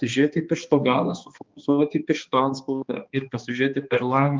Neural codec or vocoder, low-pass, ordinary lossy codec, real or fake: codec, 16 kHz, 2 kbps, X-Codec, HuBERT features, trained on balanced general audio; 7.2 kHz; Opus, 24 kbps; fake